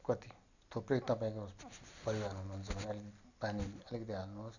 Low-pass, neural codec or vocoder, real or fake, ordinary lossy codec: 7.2 kHz; none; real; none